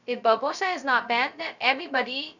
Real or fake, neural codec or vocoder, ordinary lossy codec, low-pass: fake; codec, 16 kHz, 0.2 kbps, FocalCodec; none; 7.2 kHz